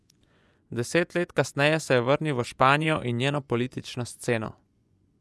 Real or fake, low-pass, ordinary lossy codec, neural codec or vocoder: fake; none; none; vocoder, 24 kHz, 100 mel bands, Vocos